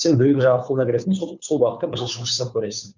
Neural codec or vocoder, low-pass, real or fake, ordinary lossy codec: codec, 16 kHz, 2 kbps, FunCodec, trained on Chinese and English, 25 frames a second; 7.2 kHz; fake; none